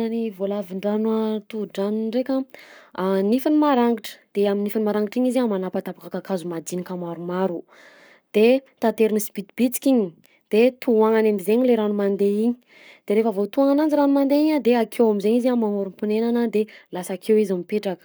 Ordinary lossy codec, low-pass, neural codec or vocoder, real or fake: none; none; codec, 44.1 kHz, 7.8 kbps, Pupu-Codec; fake